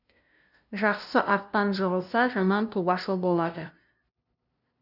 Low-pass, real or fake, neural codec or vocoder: 5.4 kHz; fake; codec, 16 kHz, 0.5 kbps, FunCodec, trained on LibriTTS, 25 frames a second